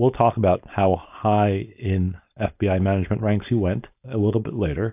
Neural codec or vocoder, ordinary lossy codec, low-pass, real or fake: codec, 16 kHz, 4.8 kbps, FACodec; AAC, 32 kbps; 3.6 kHz; fake